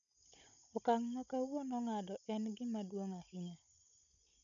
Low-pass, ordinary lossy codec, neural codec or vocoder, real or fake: 7.2 kHz; none; codec, 16 kHz, 16 kbps, FunCodec, trained on Chinese and English, 50 frames a second; fake